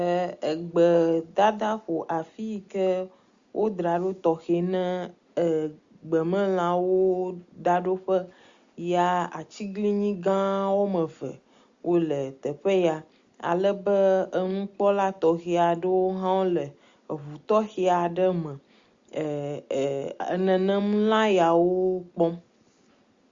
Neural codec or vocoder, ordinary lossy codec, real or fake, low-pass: none; Opus, 64 kbps; real; 7.2 kHz